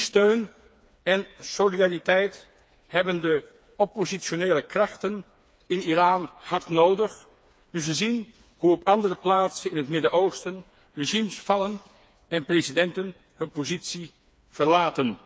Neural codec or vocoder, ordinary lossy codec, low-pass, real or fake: codec, 16 kHz, 4 kbps, FreqCodec, smaller model; none; none; fake